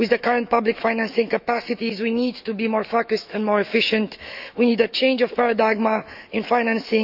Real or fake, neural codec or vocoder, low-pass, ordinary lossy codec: fake; autoencoder, 48 kHz, 128 numbers a frame, DAC-VAE, trained on Japanese speech; 5.4 kHz; none